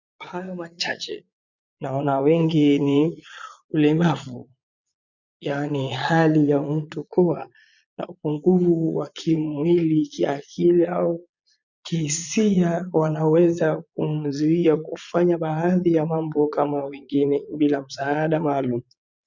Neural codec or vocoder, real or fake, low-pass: vocoder, 22.05 kHz, 80 mel bands, Vocos; fake; 7.2 kHz